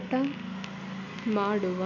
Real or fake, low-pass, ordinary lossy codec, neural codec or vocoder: real; 7.2 kHz; none; none